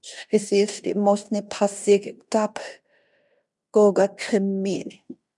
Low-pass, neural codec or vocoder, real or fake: 10.8 kHz; codec, 16 kHz in and 24 kHz out, 0.9 kbps, LongCat-Audio-Codec, fine tuned four codebook decoder; fake